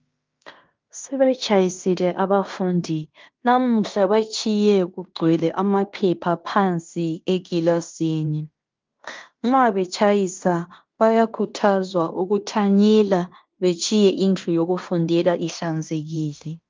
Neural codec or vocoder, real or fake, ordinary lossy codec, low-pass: codec, 16 kHz in and 24 kHz out, 0.9 kbps, LongCat-Audio-Codec, fine tuned four codebook decoder; fake; Opus, 24 kbps; 7.2 kHz